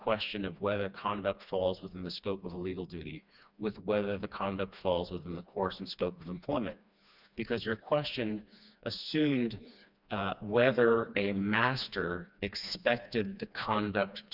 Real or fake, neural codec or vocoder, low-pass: fake; codec, 16 kHz, 2 kbps, FreqCodec, smaller model; 5.4 kHz